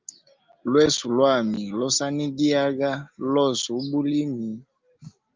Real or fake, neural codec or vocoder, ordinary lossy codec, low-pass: real; none; Opus, 24 kbps; 7.2 kHz